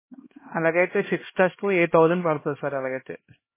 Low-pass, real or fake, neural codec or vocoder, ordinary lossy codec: 3.6 kHz; fake; codec, 16 kHz, 1 kbps, X-Codec, HuBERT features, trained on LibriSpeech; MP3, 16 kbps